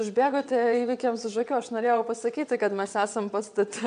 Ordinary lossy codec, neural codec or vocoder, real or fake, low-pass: MP3, 64 kbps; vocoder, 22.05 kHz, 80 mel bands, WaveNeXt; fake; 9.9 kHz